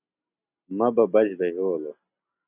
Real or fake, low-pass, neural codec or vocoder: real; 3.6 kHz; none